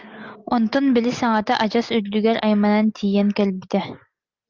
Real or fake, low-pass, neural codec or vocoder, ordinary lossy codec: real; 7.2 kHz; none; Opus, 24 kbps